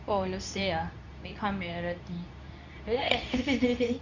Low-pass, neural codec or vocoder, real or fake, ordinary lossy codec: 7.2 kHz; codec, 24 kHz, 0.9 kbps, WavTokenizer, medium speech release version 2; fake; none